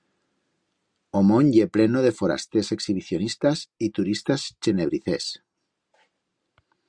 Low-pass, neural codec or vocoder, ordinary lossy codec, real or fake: 9.9 kHz; none; Opus, 64 kbps; real